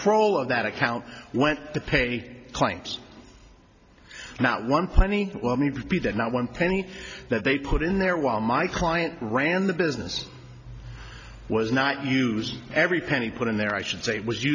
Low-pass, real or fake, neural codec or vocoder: 7.2 kHz; real; none